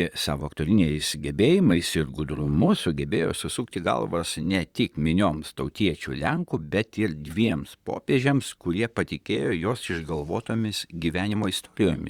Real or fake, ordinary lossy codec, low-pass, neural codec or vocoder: fake; Opus, 64 kbps; 19.8 kHz; vocoder, 44.1 kHz, 128 mel bands, Pupu-Vocoder